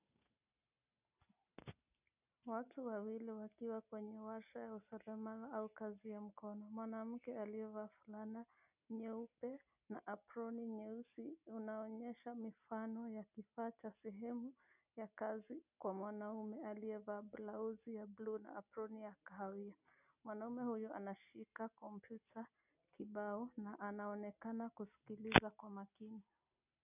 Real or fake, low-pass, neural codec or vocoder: real; 3.6 kHz; none